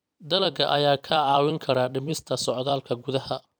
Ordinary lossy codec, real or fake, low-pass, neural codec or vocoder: none; fake; none; vocoder, 44.1 kHz, 128 mel bands every 256 samples, BigVGAN v2